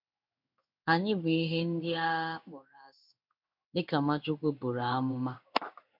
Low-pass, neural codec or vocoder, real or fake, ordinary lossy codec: 5.4 kHz; codec, 16 kHz in and 24 kHz out, 1 kbps, XY-Tokenizer; fake; none